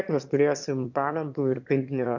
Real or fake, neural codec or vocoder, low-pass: fake; autoencoder, 22.05 kHz, a latent of 192 numbers a frame, VITS, trained on one speaker; 7.2 kHz